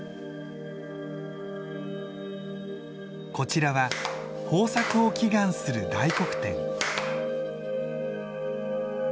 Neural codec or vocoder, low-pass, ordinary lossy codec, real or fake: none; none; none; real